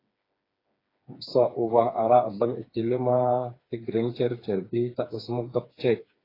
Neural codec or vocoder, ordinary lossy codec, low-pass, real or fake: codec, 16 kHz, 4 kbps, FreqCodec, smaller model; AAC, 24 kbps; 5.4 kHz; fake